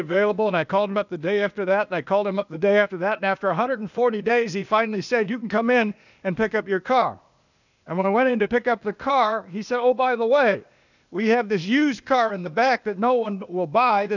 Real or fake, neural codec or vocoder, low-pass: fake; codec, 16 kHz, 0.8 kbps, ZipCodec; 7.2 kHz